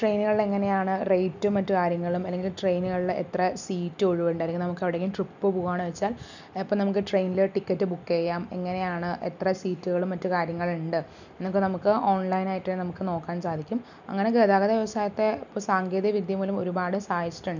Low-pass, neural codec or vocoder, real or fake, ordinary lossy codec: 7.2 kHz; none; real; none